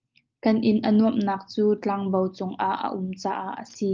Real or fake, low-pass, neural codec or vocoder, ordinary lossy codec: real; 7.2 kHz; none; Opus, 24 kbps